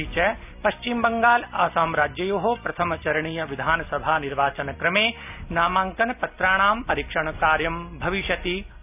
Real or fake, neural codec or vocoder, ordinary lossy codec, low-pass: real; none; none; 3.6 kHz